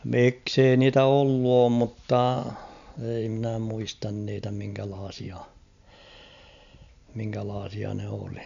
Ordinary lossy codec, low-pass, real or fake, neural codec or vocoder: none; 7.2 kHz; real; none